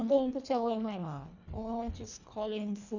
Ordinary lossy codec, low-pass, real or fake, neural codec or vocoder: none; 7.2 kHz; fake; codec, 24 kHz, 1.5 kbps, HILCodec